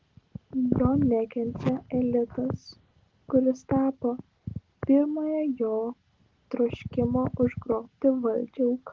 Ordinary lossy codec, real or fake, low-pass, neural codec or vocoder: Opus, 16 kbps; real; 7.2 kHz; none